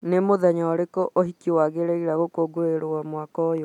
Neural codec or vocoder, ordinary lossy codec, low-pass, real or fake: none; none; 19.8 kHz; real